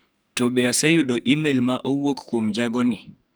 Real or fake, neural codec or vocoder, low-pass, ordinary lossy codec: fake; codec, 44.1 kHz, 2.6 kbps, SNAC; none; none